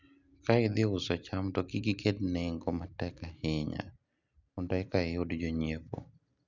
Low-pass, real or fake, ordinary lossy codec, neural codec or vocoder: 7.2 kHz; real; none; none